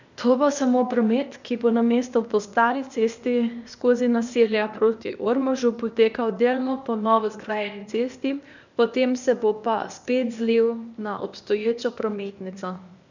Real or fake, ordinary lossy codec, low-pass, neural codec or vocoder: fake; none; 7.2 kHz; codec, 16 kHz, 0.8 kbps, ZipCodec